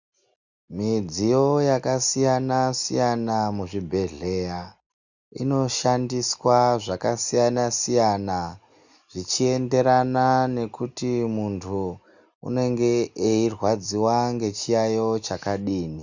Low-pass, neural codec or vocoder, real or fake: 7.2 kHz; none; real